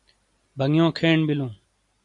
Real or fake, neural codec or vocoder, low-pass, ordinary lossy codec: real; none; 10.8 kHz; AAC, 64 kbps